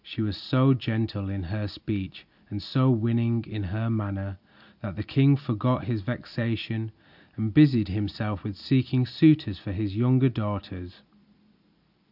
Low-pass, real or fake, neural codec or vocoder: 5.4 kHz; real; none